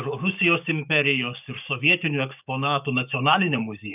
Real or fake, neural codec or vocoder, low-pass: fake; codec, 16 kHz, 6 kbps, DAC; 3.6 kHz